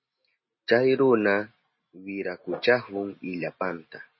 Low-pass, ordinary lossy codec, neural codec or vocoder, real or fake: 7.2 kHz; MP3, 24 kbps; none; real